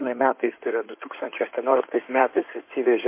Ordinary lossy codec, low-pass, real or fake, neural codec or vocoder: MP3, 24 kbps; 3.6 kHz; fake; codec, 16 kHz in and 24 kHz out, 2.2 kbps, FireRedTTS-2 codec